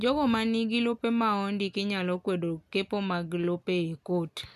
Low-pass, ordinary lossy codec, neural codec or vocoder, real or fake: 14.4 kHz; none; none; real